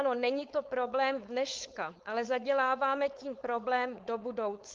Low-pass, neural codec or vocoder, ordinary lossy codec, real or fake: 7.2 kHz; codec, 16 kHz, 4.8 kbps, FACodec; Opus, 32 kbps; fake